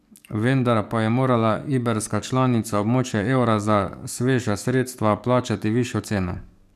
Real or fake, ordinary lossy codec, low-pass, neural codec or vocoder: fake; none; 14.4 kHz; codec, 44.1 kHz, 7.8 kbps, DAC